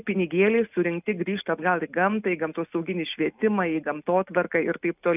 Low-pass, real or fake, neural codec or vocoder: 3.6 kHz; real; none